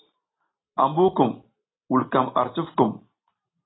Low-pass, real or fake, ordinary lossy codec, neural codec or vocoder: 7.2 kHz; real; AAC, 16 kbps; none